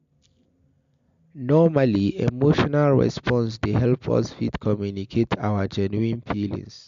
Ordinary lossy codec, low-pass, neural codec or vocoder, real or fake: MP3, 64 kbps; 7.2 kHz; none; real